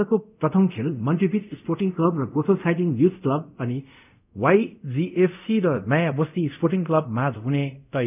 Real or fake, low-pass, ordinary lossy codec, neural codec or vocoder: fake; 3.6 kHz; none; codec, 24 kHz, 0.5 kbps, DualCodec